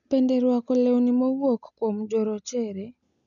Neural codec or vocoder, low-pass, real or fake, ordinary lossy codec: none; 7.2 kHz; real; none